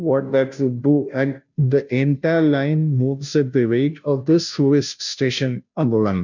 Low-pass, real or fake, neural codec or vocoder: 7.2 kHz; fake; codec, 16 kHz, 0.5 kbps, FunCodec, trained on Chinese and English, 25 frames a second